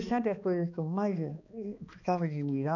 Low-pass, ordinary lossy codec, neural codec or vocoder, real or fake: 7.2 kHz; none; codec, 16 kHz, 2 kbps, X-Codec, HuBERT features, trained on balanced general audio; fake